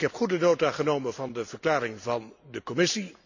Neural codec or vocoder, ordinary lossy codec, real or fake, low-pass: none; none; real; 7.2 kHz